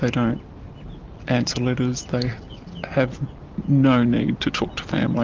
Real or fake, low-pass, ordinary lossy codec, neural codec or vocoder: real; 7.2 kHz; Opus, 32 kbps; none